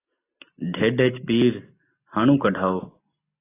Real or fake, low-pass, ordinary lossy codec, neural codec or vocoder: real; 3.6 kHz; AAC, 16 kbps; none